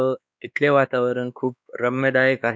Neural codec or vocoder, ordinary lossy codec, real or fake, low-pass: codec, 16 kHz, 2 kbps, X-Codec, WavLM features, trained on Multilingual LibriSpeech; none; fake; none